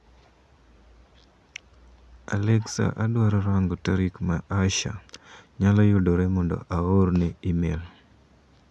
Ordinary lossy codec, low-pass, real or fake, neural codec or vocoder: none; none; real; none